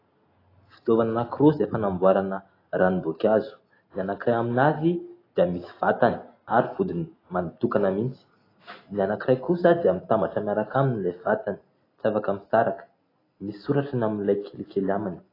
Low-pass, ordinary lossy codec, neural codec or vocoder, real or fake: 5.4 kHz; AAC, 24 kbps; none; real